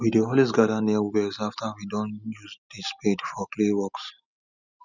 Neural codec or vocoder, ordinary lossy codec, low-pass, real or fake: none; none; 7.2 kHz; real